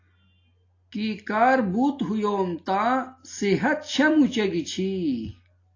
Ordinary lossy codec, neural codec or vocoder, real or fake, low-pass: AAC, 32 kbps; none; real; 7.2 kHz